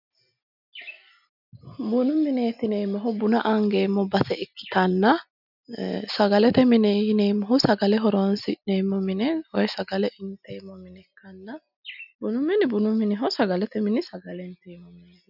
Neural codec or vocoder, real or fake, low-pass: none; real; 5.4 kHz